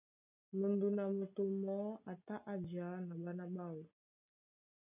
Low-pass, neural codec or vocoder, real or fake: 3.6 kHz; none; real